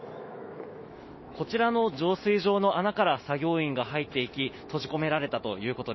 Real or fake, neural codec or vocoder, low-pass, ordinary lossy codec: fake; autoencoder, 48 kHz, 128 numbers a frame, DAC-VAE, trained on Japanese speech; 7.2 kHz; MP3, 24 kbps